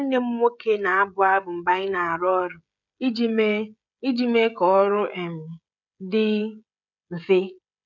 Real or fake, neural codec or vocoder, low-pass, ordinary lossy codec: fake; codec, 16 kHz, 16 kbps, FreqCodec, smaller model; 7.2 kHz; none